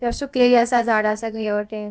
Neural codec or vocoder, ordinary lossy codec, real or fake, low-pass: codec, 16 kHz, about 1 kbps, DyCAST, with the encoder's durations; none; fake; none